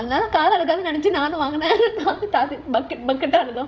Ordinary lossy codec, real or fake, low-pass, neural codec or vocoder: none; fake; none; codec, 16 kHz, 16 kbps, FreqCodec, larger model